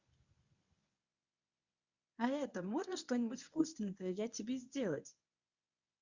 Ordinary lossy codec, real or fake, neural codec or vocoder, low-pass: Opus, 64 kbps; fake; codec, 24 kHz, 0.9 kbps, WavTokenizer, medium speech release version 1; 7.2 kHz